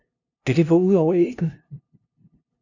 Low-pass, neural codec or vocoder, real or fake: 7.2 kHz; codec, 16 kHz, 0.5 kbps, FunCodec, trained on LibriTTS, 25 frames a second; fake